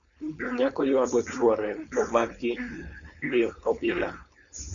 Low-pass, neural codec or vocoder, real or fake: 7.2 kHz; codec, 16 kHz, 4.8 kbps, FACodec; fake